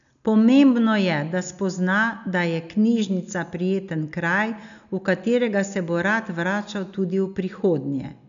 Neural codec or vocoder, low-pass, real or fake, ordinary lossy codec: none; 7.2 kHz; real; none